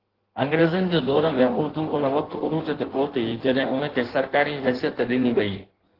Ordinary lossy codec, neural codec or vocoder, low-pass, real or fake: Opus, 16 kbps; codec, 16 kHz in and 24 kHz out, 1.1 kbps, FireRedTTS-2 codec; 5.4 kHz; fake